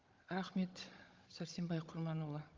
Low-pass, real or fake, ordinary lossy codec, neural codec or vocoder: 7.2 kHz; fake; Opus, 32 kbps; codec, 16 kHz, 16 kbps, FunCodec, trained on Chinese and English, 50 frames a second